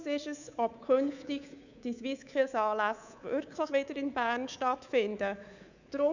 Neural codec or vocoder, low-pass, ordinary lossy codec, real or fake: codec, 24 kHz, 3.1 kbps, DualCodec; 7.2 kHz; none; fake